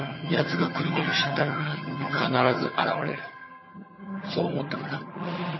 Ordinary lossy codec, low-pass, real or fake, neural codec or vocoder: MP3, 24 kbps; 7.2 kHz; fake; vocoder, 22.05 kHz, 80 mel bands, HiFi-GAN